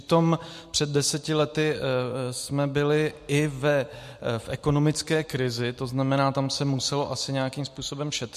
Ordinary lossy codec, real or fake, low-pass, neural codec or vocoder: MP3, 64 kbps; real; 14.4 kHz; none